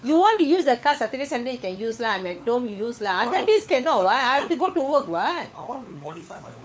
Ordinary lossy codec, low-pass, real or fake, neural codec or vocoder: none; none; fake; codec, 16 kHz, 4 kbps, FunCodec, trained on LibriTTS, 50 frames a second